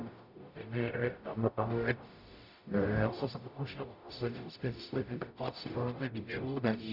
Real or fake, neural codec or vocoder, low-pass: fake; codec, 44.1 kHz, 0.9 kbps, DAC; 5.4 kHz